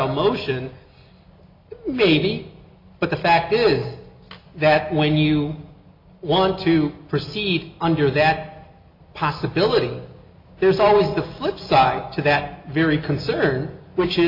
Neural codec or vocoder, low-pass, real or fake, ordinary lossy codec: none; 5.4 kHz; real; MP3, 48 kbps